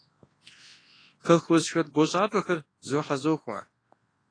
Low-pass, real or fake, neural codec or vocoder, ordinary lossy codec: 9.9 kHz; fake; codec, 24 kHz, 0.9 kbps, WavTokenizer, large speech release; AAC, 32 kbps